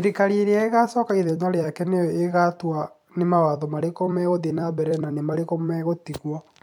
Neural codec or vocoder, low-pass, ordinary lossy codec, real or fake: vocoder, 44.1 kHz, 128 mel bands every 256 samples, BigVGAN v2; 19.8 kHz; MP3, 96 kbps; fake